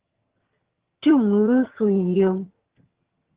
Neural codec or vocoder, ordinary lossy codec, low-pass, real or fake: vocoder, 22.05 kHz, 80 mel bands, HiFi-GAN; Opus, 16 kbps; 3.6 kHz; fake